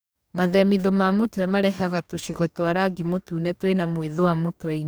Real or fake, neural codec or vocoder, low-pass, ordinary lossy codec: fake; codec, 44.1 kHz, 2.6 kbps, DAC; none; none